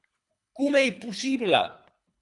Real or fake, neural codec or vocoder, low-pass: fake; codec, 24 kHz, 3 kbps, HILCodec; 10.8 kHz